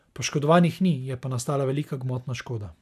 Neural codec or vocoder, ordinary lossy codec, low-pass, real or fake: none; none; 14.4 kHz; real